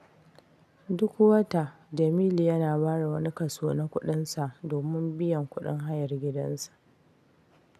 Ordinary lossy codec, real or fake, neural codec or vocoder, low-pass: none; real; none; 14.4 kHz